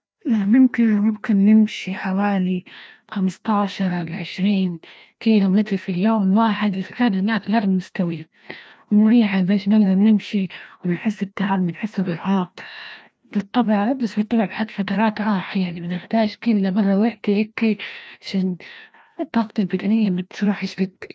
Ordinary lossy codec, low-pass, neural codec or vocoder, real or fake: none; none; codec, 16 kHz, 1 kbps, FreqCodec, larger model; fake